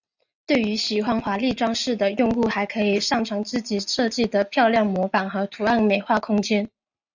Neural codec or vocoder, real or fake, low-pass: none; real; 7.2 kHz